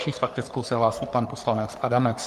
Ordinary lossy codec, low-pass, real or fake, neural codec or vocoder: Opus, 32 kbps; 14.4 kHz; fake; codec, 44.1 kHz, 3.4 kbps, Pupu-Codec